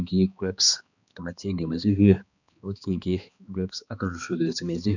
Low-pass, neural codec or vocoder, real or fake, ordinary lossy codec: 7.2 kHz; codec, 16 kHz, 2 kbps, X-Codec, HuBERT features, trained on balanced general audio; fake; none